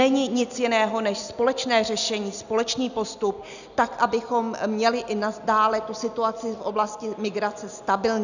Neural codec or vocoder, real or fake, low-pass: none; real; 7.2 kHz